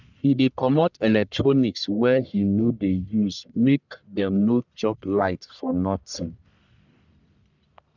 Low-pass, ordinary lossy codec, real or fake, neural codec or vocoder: 7.2 kHz; none; fake; codec, 44.1 kHz, 1.7 kbps, Pupu-Codec